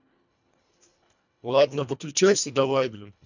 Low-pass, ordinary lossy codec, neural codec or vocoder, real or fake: 7.2 kHz; none; codec, 24 kHz, 1.5 kbps, HILCodec; fake